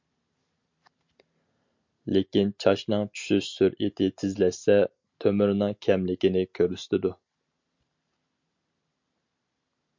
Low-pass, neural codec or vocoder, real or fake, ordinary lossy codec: 7.2 kHz; none; real; MP3, 48 kbps